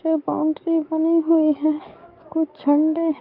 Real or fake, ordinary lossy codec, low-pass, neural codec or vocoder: fake; Opus, 24 kbps; 5.4 kHz; codec, 44.1 kHz, 7.8 kbps, DAC